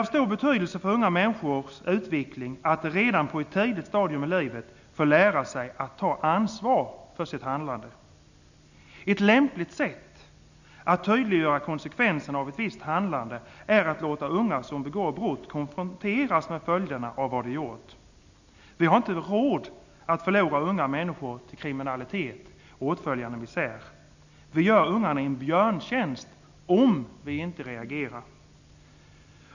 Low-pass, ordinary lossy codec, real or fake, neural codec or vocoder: 7.2 kHz; none; real; none